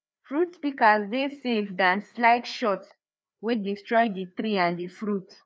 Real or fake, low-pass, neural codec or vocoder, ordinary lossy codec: fake; none; codec, 16 kHz, 2 kbps, FreqCodec, larger model; none